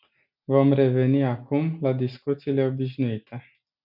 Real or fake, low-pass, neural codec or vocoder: real; 5.4 kHz; none